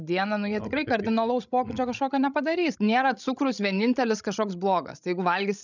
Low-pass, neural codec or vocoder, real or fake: 7.2 kHz; codec, 16 kHz, 16 kbps, FreqCodec, larger model; fake